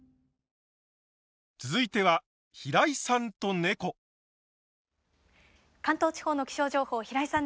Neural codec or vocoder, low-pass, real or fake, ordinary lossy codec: none; none; real; none